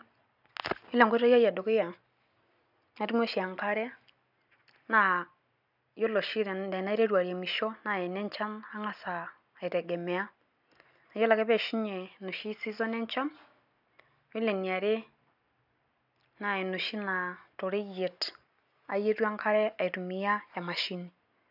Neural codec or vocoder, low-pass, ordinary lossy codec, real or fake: none; 5.4 kHz; none; real